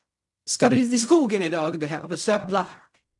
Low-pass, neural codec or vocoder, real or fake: 10.8 kHz; codec, 16 kHz in and 24 kHz out, 0.4 kbps, LongCat-Audio-Codec, fine tuned four codebook decoder; fake